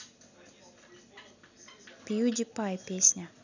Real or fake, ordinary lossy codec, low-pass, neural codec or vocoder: real; none; 7.2 kHz; none